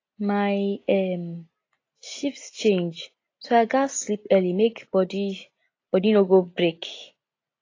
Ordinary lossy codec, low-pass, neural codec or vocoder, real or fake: AAC, 32 kbps; 7.2 kHz; none; real